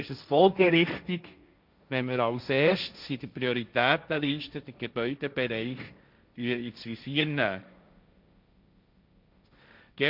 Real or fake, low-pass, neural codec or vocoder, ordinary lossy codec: fake; 5.4 kHz; codec, 16 kHz, 1.1 kbps, Voila-Tokenizer; none